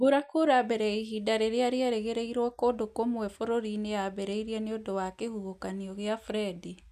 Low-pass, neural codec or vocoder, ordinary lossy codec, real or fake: 14.4 kHz; none; none; real